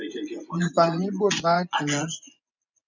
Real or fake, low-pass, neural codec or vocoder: fake; 7.2 kHz; vocoder, 24 kHz, 100 mel bands, Vocos